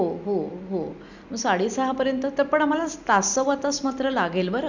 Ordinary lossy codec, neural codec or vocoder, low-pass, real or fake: none; none; 7.2 kHz; real